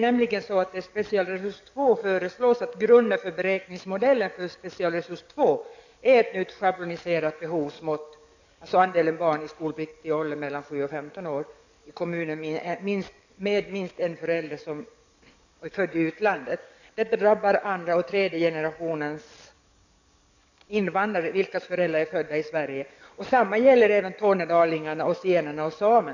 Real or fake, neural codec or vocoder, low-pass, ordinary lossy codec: fake; codec, 44.1 kHz, 7.8 kbps, DAC; 7.2 kHz; none